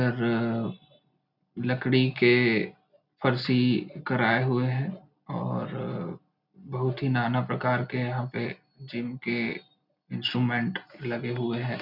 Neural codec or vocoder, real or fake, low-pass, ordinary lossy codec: none; real; 5.4 kHz; none